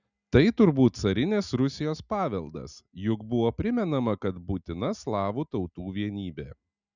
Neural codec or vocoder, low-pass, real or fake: none; 7.2 kHz; real